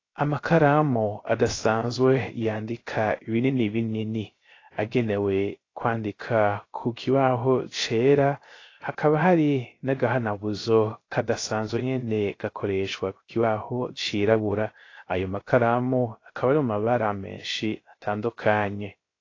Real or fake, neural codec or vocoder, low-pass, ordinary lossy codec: fake; codec, 16 kHz, 0.3 kbps, FocalCodec; 7.2 kHz; AAC, 32 kbps